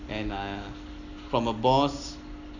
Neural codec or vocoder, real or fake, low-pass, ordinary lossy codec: none; real; 7.2 kHz; none